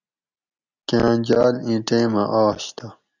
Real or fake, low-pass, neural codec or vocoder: real; 7.2 kHz; none